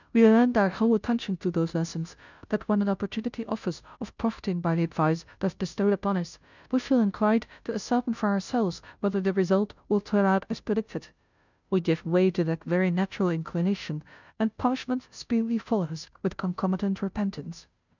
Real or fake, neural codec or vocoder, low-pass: fake; codec, 16 kHz, 0.5 kbps, FunCodec, trained on Chinese and English, 25 frames a second; 7.2 kHz